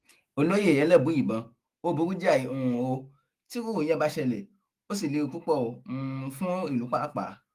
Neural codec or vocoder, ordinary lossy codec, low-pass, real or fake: autoencoder, 48 kHz, 128 numbers a frame, DAC-VAE, trained on Japanese speech; Opus, 24 kbps; 14.4 kHz; fake